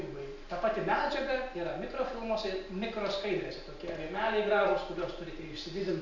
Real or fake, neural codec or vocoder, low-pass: real; none; 7.2 kHz